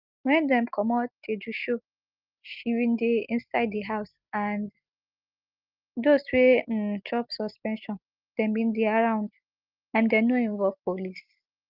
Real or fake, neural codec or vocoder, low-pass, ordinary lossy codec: real; none; 5.4 kHz; Opus, 24 kbps